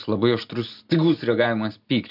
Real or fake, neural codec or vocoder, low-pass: real; none; 5.4 kHz